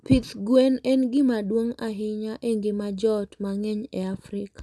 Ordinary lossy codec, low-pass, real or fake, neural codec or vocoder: none; none; real; none